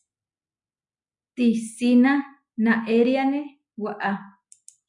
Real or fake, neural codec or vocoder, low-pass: real; none; 10.8 kHz